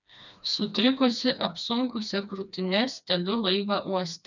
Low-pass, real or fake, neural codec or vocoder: 7.2 kHz; fake; codec, 16 kHz, 2 kbps, FreqCodec, smaller model